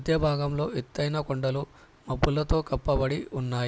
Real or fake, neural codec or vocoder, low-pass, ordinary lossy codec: real; none; none; none